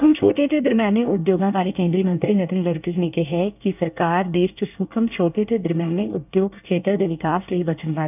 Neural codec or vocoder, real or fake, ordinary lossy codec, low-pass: codec, 24 kHz, 1 kbps, SNAC; fake; none; 3.6 kHz